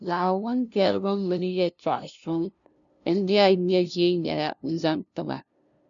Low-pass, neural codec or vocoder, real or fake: 7.2 kHz; codec, 16 kHz, 0.5 kbps, FunCodec, trained on LibriTTS, 25 frames a second; fake